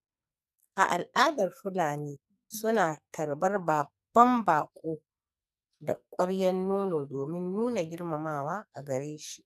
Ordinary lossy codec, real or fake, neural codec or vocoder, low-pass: none; fake; codec, 44.1 kHz, 2.6 kbps, SNAC; 14.4 kHz